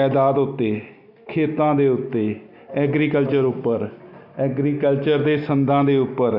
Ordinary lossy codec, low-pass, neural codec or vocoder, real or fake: none; 5.4 kHz; none; real